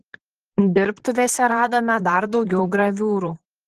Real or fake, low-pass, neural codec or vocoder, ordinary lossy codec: fake; 14.4 kHz; vocoder, 44.1 kHz, 128 mel bands, Pupu-Vocoder; Opus, 16 kbps